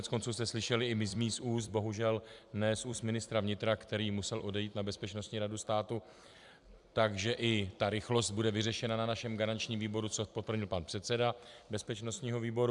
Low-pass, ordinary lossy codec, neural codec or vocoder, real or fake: 10.8 kHz; MP3, 96 kbps; vocoder, 44.1 kHz, 128 mel bands every 256 samples, BigVGAN v2; fake